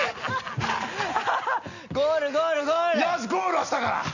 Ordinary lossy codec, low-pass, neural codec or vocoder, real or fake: none; 7.2 kHz; none; real